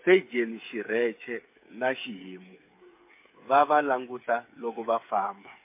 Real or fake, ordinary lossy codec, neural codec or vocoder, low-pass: fake; MP3, 24 kbps; codec, 16 kHz, 8 kbps, FreqCodec, smaller model; 3.6 kHz